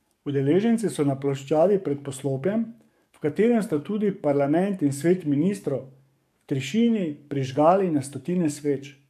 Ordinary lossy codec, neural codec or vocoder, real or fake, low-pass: MP3, 64 kbps; codec, 44.1 kHz, 7.8 kbps, DAC; fake; 14.4 kHz